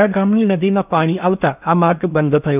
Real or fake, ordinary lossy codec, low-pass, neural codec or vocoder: fake; none; 3.6 kHz; codec, 16 kHz in and 24 kHz out, 0.8 kbps, FocalCodec, streaming, 65536 codes